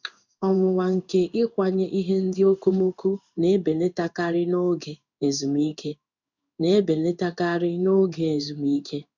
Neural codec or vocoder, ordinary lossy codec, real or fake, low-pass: codec, 16 kHz in and 24 kHz out, 1 kbps, XY-Tokenizer; none; fake; 7.2 kHz